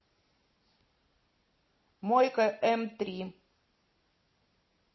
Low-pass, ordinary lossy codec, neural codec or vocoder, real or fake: 7.2 kHz; MP3, 24 kbps; none; real